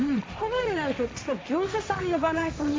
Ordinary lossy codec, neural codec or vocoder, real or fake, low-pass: none; codec, 16 kHz, 1.1 kbps, Voila-Tokenizer; fake; none